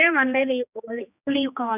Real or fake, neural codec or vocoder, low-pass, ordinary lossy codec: fake; codec, 16 kHz, 2 kbps, X-Codec, HuBERT features, trained on general audio; 3.6 kHz; none